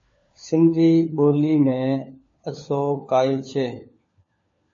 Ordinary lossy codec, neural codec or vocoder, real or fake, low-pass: MP3, 32 kbps; codec, 16 kHz, 4 kbps, FunCodec, trained on LibriTTS, 50 frames a second; fake; 7.2 kHz